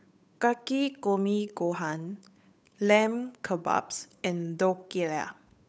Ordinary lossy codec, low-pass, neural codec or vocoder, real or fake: none; none; codec, 16 kHz, 8 kbps, FunCodec, trained on Chinese and English, 25 frames a second; fake